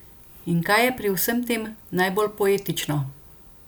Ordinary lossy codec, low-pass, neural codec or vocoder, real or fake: none; none; none; real